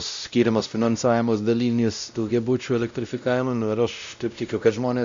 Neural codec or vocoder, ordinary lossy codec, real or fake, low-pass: codec, 16 kHz, 0.5 kbps, X-Codec, WavLM features, trained on Multilingual LibriSpeech; MP3, 64 kbps; fake; 7.2 kHz